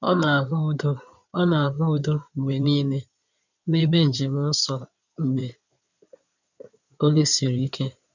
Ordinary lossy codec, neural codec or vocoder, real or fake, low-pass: none; codec, 16 kHz in and 24 kHz out, 2.2 kbps, FireRedTTS-2 codec; fake; 7.2 kHz